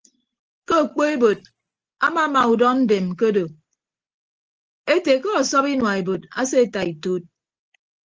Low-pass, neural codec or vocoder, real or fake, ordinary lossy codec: 7.2 kHz; none; real; Opus, 16 kbps